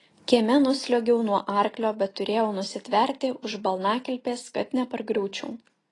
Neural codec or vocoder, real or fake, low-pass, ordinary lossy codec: none; real; 10.8 kHz; AAC, 32 kbps